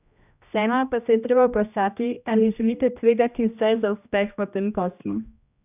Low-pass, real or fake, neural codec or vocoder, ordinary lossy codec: 3.6 kHz; fake; codec, 16 kHz, 1 kbps, X-Codec, HuBERT features, trained on general audio; none